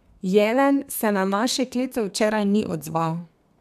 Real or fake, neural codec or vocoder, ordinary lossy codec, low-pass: fake; codec, 32 kHz, 1.9 kbps, SNAC; none; 14.4 kHz